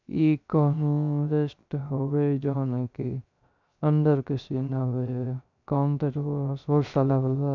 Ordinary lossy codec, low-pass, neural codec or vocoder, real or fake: none; 7.2 kHz; codec, 16 kHz, 0.3 kbps, FocalCodec; fake